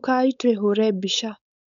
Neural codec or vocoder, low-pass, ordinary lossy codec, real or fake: codec, 16 kHz, 4.8 kbps, FACodec; 7.2 kHz; none; fake